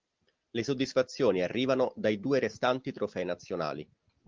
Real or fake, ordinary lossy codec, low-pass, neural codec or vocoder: real; Opus, 32 kbps; 7.2 kHz; none